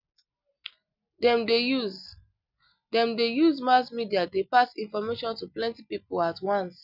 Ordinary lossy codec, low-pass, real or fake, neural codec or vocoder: none; 5.4 kHz; real; none